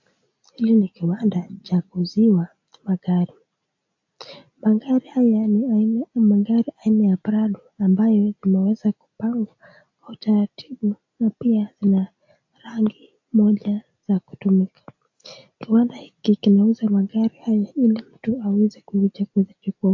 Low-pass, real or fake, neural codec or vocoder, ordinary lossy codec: 7.2 kHz; real; none; AAC, 48 kbps